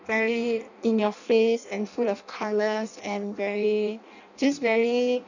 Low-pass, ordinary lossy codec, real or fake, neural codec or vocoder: 7.2 kHz; none; fake; codec, 16 kHz in and 24 kHz out, 0.6 kbps, FireRedTTS-2 codec